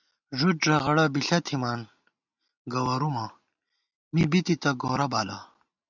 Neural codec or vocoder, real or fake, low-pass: none; real; 7.2 kHz